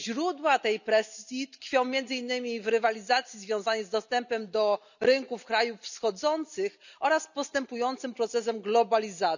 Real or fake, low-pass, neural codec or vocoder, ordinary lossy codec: real; 7.2 kHz; none; none